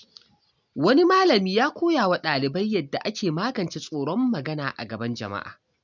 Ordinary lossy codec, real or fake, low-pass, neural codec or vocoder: none; real; 7.2 kHz; none